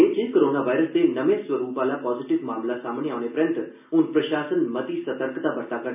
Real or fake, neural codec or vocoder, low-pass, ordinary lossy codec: real; none; 3.6 kHz; none